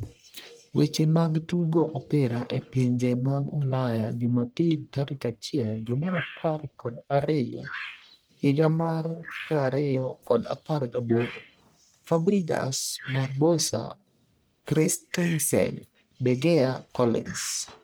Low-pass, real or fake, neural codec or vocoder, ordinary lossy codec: none; fake; codec, 44.1 kHz, 1.7 kbps, Pupu-Codec; none